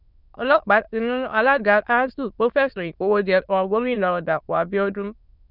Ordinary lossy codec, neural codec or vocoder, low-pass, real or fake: none; autoencoder, 22.05 kHz, a latent of 192 numbers a frame, VITS, trained on many speakers; 5.4 kHz; fake